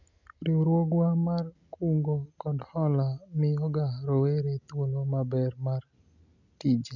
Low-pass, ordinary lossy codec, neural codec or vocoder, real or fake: 7.2 kHz; none; none; real